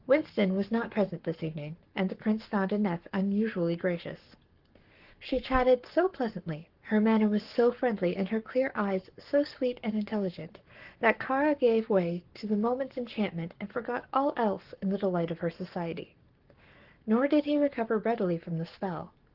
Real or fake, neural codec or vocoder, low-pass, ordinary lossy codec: fake; codec, 44.1 kHz, 7.8 kbps, Pupu-Codec; 5.4 kHz; Opus, 16 kbps